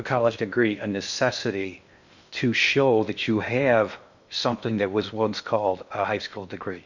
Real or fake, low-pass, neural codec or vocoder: fake; 7.2 kHz; codec, 16 kHz in and 24 kHz out, 0.6 kbps, FocalCodec, streaming, 2048 codes